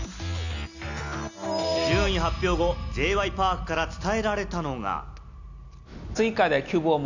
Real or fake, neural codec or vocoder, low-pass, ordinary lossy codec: real; none; 7.2 kHz; none